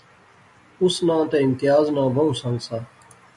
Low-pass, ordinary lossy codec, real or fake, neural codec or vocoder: 10.8 kHz; MP3, 96 kbps; real; none